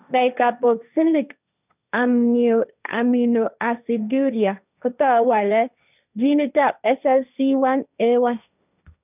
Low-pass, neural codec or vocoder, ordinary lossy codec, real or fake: 3.6 kHz; codec, 16 kHz, 1.1 kbps, Voila-Tokenizer; none; fake